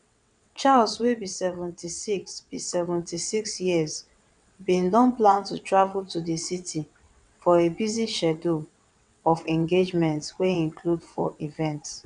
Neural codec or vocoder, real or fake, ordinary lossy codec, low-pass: vocoder, 22.05 kHz, 80 mel bands, WaveNeXt; fake; none; 9.9 kHz